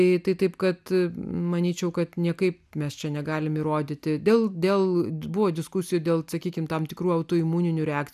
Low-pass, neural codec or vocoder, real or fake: 14.4 kHz; none; real